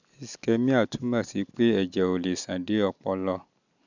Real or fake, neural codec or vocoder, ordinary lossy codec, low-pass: real; none; none; 7.2 kHz